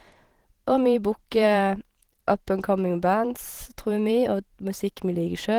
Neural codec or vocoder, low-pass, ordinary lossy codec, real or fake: vocoder, 44.1 kHz, 128 mel bands every 512 samples, BigVGAN v2; 19.8 kHz; Opus, 16 kbps; fake